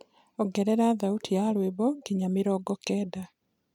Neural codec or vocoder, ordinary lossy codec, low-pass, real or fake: vocoder, 44.1 kHz, 128 mel bands every 256 samples, BigVGAN v2; none; 19.8 kHz; fake